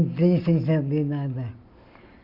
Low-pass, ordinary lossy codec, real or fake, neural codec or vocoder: 5.4 kHz; Opus, 64 kbps; real; none